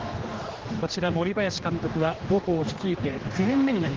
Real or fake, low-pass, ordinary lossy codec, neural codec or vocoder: fake; 7.2 kHz; Opus, 16 kbps; codec, 16 kHz, 2 kbps, X-Codec, HuBERT features, trained on general audio